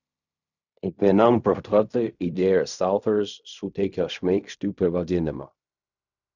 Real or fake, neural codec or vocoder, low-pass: fake; codec, 16 kHz in and 24 kHz out, 0.4 kbps, LongCat-Audio-Codec, fine tuned four codebook decoder; 7.2 kHz